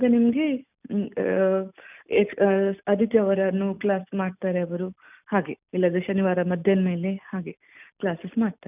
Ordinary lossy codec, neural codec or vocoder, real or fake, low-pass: none; none; real; 3.6 kHz